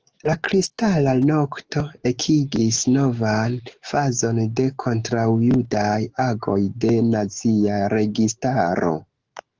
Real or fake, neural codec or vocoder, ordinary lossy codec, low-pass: fake; autoencoder, 48 kHz, 128 numbers a frame, DAC-VAE, trained on Japanese speech; Opus, 16 kbps; 7.2 kHz